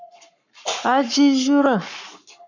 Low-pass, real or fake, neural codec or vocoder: 7.2 kHz; fake; codec, 44.1 kHz, 7.8 kbps, Pupu-Codec